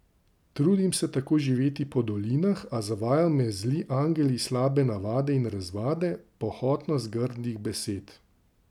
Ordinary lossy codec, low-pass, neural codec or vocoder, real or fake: none; 19.8 kHz; none; real